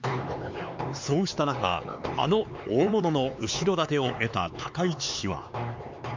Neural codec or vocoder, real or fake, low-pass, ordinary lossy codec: codec, 16 kHz, 4 kbps, X-Codec, HuBERT features, trained on LibriSpeech; fake; 7.2 kHz; MP3, 64 kbps